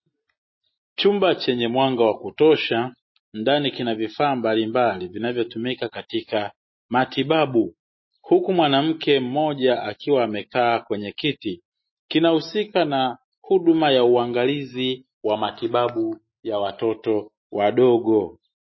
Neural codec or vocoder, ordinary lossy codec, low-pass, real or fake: none; MP3, 24 kbps; 7.2 kHz; real